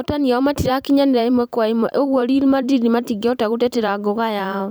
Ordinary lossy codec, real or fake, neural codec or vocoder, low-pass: none; fake; vocoder, 44.1 kHz, 128 mel bands every 512 samples, BigVGAN v2; none